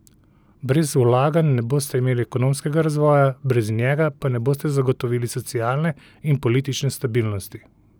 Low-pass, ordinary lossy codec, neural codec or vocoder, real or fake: none; none; none; real